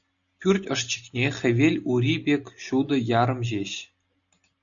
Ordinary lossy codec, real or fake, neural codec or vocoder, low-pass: MP3, 96 kbps; real; none; 7.2 kHz